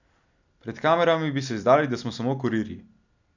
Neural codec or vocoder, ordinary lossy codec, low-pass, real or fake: none; none; 7.2 kHz; real